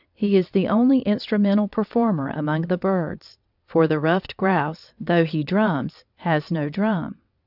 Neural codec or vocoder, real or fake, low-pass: vocoder, 22.05 kHz, 80 mel bands, WaveNeXt; fake; 5.4 kHz